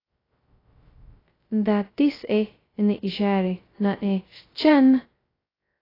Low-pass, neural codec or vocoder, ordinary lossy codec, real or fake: 5.4 kHz; codec, 16 kHz, 0.2 kbps, FocalCodec; AAC, 24 kbps; fake